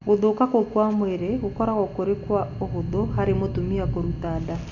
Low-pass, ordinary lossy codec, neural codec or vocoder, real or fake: 7.2 kHz; none; none; real